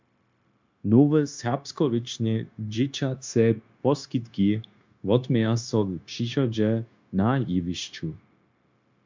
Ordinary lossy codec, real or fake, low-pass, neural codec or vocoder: MP3, 64 kbps; fake; 7.2 kHz; codec, 16 kHz, 0.9 kbps, LongCat-Audio-Codec